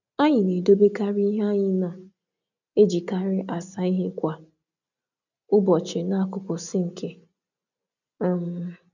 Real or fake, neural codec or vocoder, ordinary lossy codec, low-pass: real; none; none; 7.2 kHz